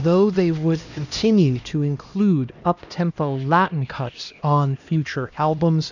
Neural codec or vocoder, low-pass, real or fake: codec, 16 kHz, 1 kbps, X-Codec, HuBERT features, trained on LibriSpeech; 7.2 kHz; fake